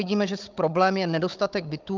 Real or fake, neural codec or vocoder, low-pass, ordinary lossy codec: fake; codec, 16 kHz, 16 kbps, FunCodec, trained on Chinese and English, 50 frames a second; 7.2 kHz; Opus, 32 kbps